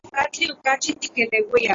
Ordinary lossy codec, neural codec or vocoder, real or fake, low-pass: AAC, 32 kbps; none; real; 7.2 kHz